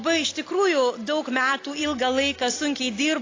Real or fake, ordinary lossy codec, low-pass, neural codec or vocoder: real; AAC, 32 kbps; 7.2 kHz; none